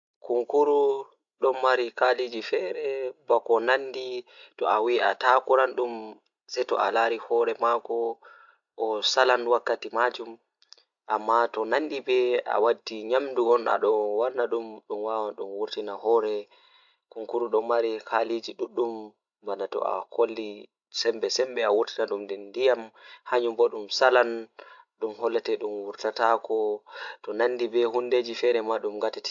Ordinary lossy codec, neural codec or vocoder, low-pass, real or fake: AAC, 64 kbps; none; 7.2 kHz; real